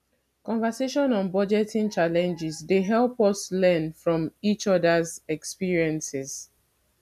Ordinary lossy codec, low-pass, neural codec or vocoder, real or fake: none; 14.4 kHz; none; real